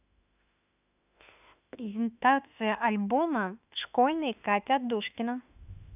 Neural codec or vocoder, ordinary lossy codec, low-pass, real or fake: autoencoder, 48 kHz, 32 numbers a frame, DAC-VAE, trained on Japanese speech; none; 3.6 kHz; fake